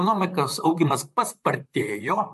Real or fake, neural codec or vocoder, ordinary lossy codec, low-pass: fake; vocoder, 44.1 kHz, 128 mel bands, Pupu-Vocoder; MP3, 64 kbps; 14.4 kHz